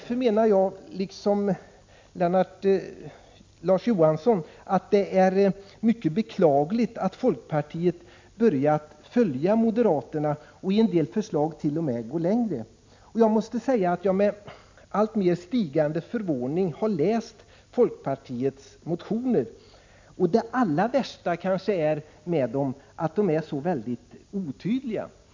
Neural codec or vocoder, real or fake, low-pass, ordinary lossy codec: none; real; 7.2 kHz; MP3, 64 kbps